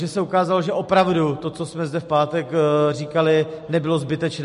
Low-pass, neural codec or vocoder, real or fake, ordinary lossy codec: 10.8 kHz; none; real; MP3, 48 kbps